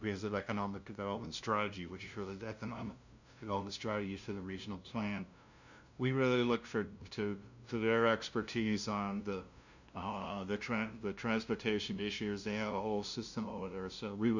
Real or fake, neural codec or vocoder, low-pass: fake; codec, 16 kHz, 0.5 kbps, FunCodec, trained on LibriTTS, 25 frames a second; 7.2 kHz